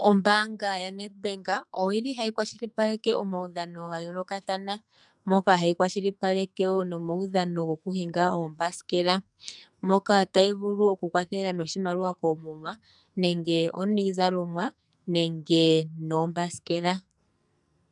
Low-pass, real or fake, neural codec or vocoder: 10.8 kHz; fake; codec, 44.1 kHz, 2.6 kbps, SNAC